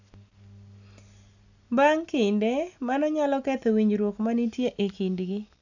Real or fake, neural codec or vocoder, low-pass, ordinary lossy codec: real; none; 7.2 kHz; none